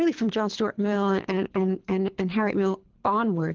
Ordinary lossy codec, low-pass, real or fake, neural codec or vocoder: Opus, 16 kbps; 7.2 kHz; fake; codec, 24 kHz, 3 kbps, HILCodec